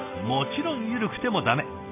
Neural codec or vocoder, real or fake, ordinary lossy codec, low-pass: none; real; AAC, 32 kbps; 3.6 kHz